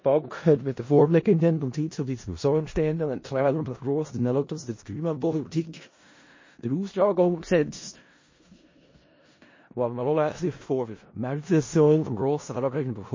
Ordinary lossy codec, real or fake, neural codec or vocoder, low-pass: MP3, 32 kbps; fake; codec, 16 kHz in and 24 kHz out, 0.4 kbps, LongCat-Audio-Codec, four codebook decoder; 7.2 kHz